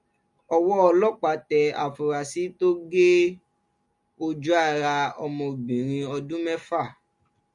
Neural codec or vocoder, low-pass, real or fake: none; 10.8 kHz; real